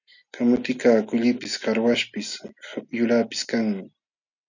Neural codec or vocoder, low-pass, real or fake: none; 7.2 kHz; real